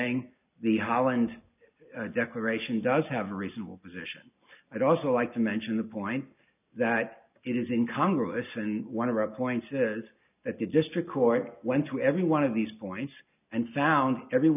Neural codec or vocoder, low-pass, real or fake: none; 3.6 kHz; real